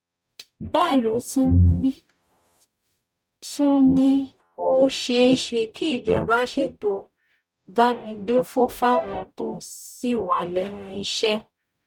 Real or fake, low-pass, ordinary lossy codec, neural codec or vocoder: fake; 19.8 kHz; none; codec, 44.1 kHz, 0.9 kbps, DAC